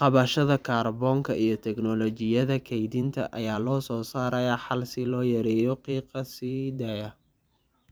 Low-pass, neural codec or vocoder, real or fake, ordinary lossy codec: none; vocoder, 44.1 kHz, 128 mel bands every 512 samples, BigVGAN v2; fake; none